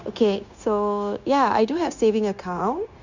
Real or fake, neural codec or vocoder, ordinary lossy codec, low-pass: fake; codec, 16 kHz, 0.9 kbps, LongCat-Audio-Codec; Opus, 64 kbps; 7.2 kHz